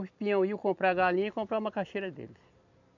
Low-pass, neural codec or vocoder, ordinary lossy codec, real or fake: 7.2 kHz; vocoder, 44.1 kHz, 128 mel bands every 256 samples, BigVGAN v2; none; fake